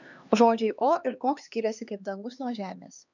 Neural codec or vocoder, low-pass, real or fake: codec, 16 kHz, 2 kbps, X-Codec, HuBERT features, trained on LibriSpeech; 7.2 kHz; fake